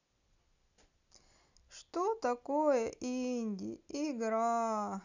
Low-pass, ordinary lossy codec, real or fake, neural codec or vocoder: 7.2 kHz; none; real; none